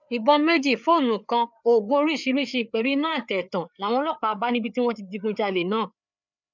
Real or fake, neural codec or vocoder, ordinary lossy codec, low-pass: fake; codec, 16 kHz, 4 kbps, FreqCodec, larger model; none; 7.2 kHz